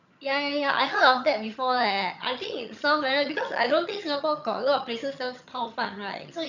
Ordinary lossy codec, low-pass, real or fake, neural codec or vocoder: none; 7.2 kHz; fake; vocoder, 22.05 kHz, 80 mel bands, HiFi-GAN